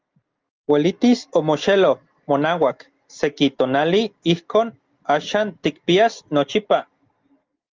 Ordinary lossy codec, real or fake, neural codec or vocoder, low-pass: Opus, 32 kbps; real; none; 7.2 kHz